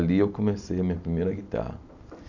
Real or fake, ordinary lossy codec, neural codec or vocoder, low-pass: real; none; none; 7.2 kHz